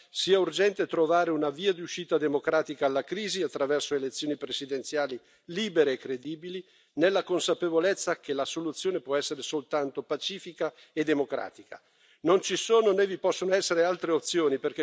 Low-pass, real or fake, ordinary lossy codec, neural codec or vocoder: none; real; none; none